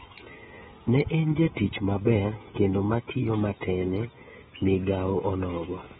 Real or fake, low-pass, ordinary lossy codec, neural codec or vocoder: fake; 7.2 kHz; AAC, 16 kbps; codec, 16 kHz, 16 kbps, FreqCodec, smaller model